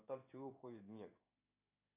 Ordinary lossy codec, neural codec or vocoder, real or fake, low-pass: AAC, 24 kbps; codec, 16 kHz in and 24 kHz out, 1 kbps, XY-Tokenizer; fake; 3.6 kHz